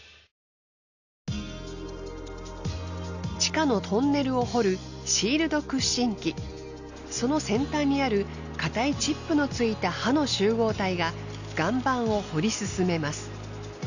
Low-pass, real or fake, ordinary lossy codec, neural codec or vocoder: 7.2 kHz; real; none; none